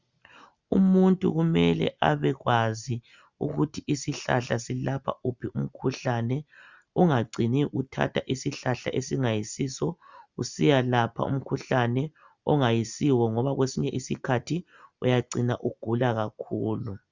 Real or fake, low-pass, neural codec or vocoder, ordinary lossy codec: real; 7.2 kHz; none; Opus, 64 kbps